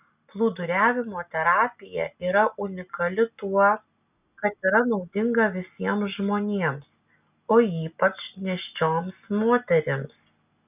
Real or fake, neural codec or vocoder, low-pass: real; none; 3.6 kHz